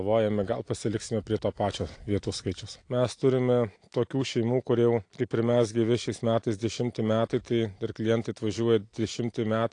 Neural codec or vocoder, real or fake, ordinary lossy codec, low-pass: none; real; AAC, 48 kbps; 9.9 kHz